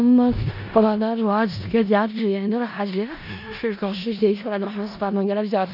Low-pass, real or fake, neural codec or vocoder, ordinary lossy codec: 5.4 kHz; fake; codec, 16 kHz in and 24 kHz out, 0.4 kbps, LongCat-Audio-Codec, four codebook decoder; none